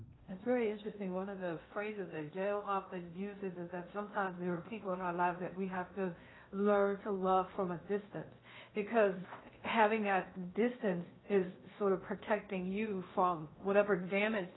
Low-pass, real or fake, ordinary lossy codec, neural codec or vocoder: 7.2 kHz; fake; AAC, 16 kbps; codec, 16 kHz in and 24 kHz out, 0.8 kbps, FocalCodec, streaming, 65536 codes